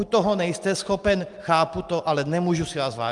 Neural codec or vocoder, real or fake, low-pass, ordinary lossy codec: none; real; 10.8 kHz; Opus, 32 kbps